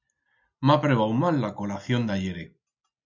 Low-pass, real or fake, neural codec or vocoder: 7.2 kHz; real; none